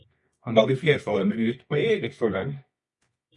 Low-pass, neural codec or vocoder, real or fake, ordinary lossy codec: 10.8 kHz; codec, 24 kHz, 0.9 kbps, WavTokenizer, medium music audio release; fake; MP3, 48 kbps